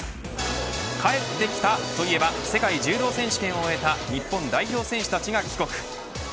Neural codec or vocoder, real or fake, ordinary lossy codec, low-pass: none; real; none; none